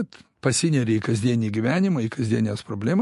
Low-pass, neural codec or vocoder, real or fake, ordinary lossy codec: 14.4 kHz; autoencoder, 48 kHz, 128 numbers a frame, DAC-VAE, trained on Japanese speech; fake; MP3, 48 kbps